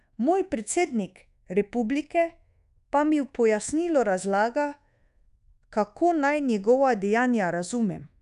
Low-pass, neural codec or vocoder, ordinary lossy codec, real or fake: 10.8 kHz; codec, 24 kHz, 1.2 kbps, DualCodec; none; fake